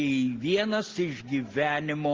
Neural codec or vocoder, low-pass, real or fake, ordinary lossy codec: none; 7.2 kHz; real; Opus, 16 kbps